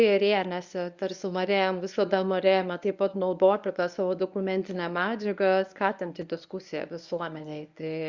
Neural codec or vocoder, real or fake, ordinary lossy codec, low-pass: codec, 24 kHz, 0.9 kbps, WavTokenizer, medium speech release version 1; fake; Opus, 64 kbps; 7.2 kHz